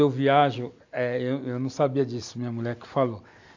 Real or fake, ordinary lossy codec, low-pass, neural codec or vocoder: real; AAC, 48 kbps; 7.2 kHz; none